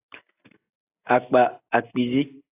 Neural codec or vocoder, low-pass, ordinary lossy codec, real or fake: none; 3.6 kHz; AAC, 24 kbps; real